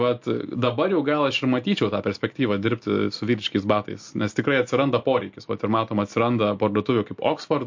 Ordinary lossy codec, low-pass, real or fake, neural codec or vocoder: MP3, 48 kbps; 7.2 kHz; real; none